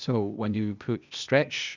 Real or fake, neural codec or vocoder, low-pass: fake; codec, 16 kHz, 0.8 kbps, ZipCodec; 7.2 kHz